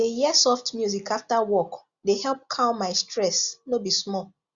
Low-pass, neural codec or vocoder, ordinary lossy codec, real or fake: 7.2 kHz; none; Opus, 64 kbps; real